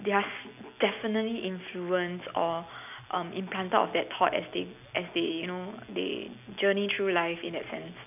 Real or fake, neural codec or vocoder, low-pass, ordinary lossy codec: real; none; 3.6 kHz; none